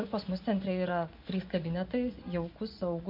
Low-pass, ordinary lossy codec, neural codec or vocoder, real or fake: 5.4 kHz; MP3, 48 kbps; vocoder, 24 kHz, 100 mel bands, Vocos; fake